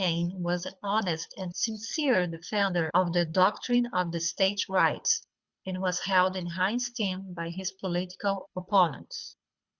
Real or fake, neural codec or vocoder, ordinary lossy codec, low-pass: fake; codec, 24 kHz, 6 kbps, HILCodec; Opus, 64 kbps; 7.2 kHz